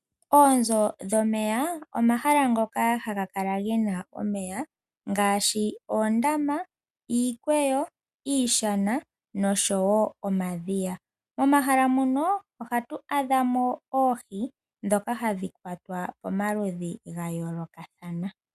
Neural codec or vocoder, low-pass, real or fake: none; 14.4 kHz; real